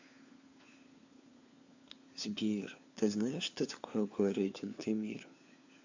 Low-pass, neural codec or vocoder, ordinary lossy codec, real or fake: 7.2 kHz; codec, 16 kHz, 4 kbps, FunCodec, trained on LibriTTS, 50 frames a second; AAC, 48 kbps; fake